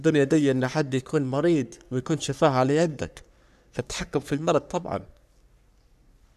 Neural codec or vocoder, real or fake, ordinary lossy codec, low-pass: codec, 44.1 kHz, 3.4 kbps, Pupu-Codec; fake; none; 14.4 kHz